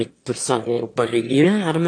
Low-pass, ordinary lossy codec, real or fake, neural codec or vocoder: 9.9 kHz; AAC, 48 kbps; fake; autoencoder, 22.05 kHz, a latent of 192 numbers a frame, VITS, trained on one speaker